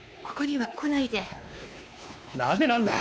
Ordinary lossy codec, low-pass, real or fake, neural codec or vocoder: none; none; fake; codec, 16 kHz, 2 kbps, X-Codec, WavLM features, trained on Multilingual LibriSpeech